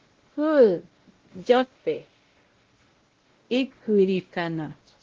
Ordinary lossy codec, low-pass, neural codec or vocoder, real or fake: Opus, 16 kbps; 7.2 kHz; codec, 16 kHz, 0.5 kbps, X-Codec, WavLM features, trained on Multilingual LibriSpeech; fake